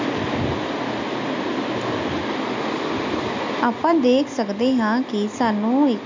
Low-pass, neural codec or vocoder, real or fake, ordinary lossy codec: 7.2 kHz; none; real; MP3, 48 kbps